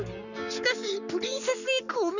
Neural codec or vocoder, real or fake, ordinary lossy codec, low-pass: codec, 44.1 kHz, 7.8 kbps, Pupu-Codec; fake; none; 7.2 kHz